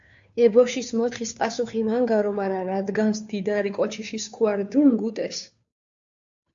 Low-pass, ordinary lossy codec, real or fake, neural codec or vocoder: 7.2 kHz; AAC, 64 kbps; fake; codec, 16 kHz, 2 kbps, FunCodec, trained on Chinese and English, 25 frames a second